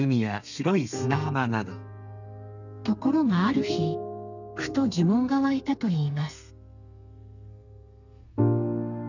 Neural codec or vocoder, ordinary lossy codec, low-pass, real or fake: codec, 32 kHz, 1.9 kbps, SNAC; none; 7.2 kHz; fake